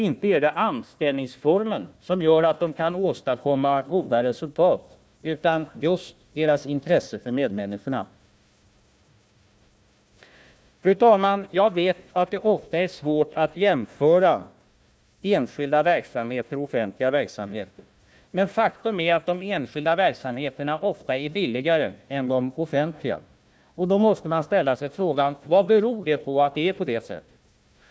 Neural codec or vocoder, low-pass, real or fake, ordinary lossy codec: codec, 16 kHz, 1 kbps, FunCodec, trained on Chinese and English, 50 frames a second; none; fake; none